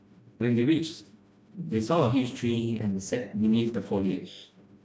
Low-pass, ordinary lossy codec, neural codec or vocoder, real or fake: none; none; codec, 16 kHz, 1 kbps, FreqCodec, smaller model; fake